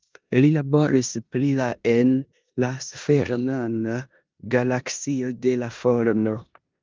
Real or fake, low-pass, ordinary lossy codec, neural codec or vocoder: fake; 7.2 kHz; Opus, 24 kbps; codec, 16 kHz in and 24 kHz out, 0.9 kbps, LongCat-Audio-Codec, four codebook decoder